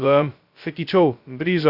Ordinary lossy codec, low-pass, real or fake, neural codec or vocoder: Opus, 64 kbps; 5.4 kHz; fake; codec, 16 kHz, 0.2 kbps, FocalCodec